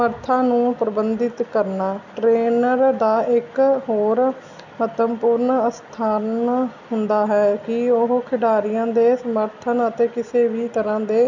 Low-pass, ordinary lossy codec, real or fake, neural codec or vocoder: 7.2 kHz; none; real; none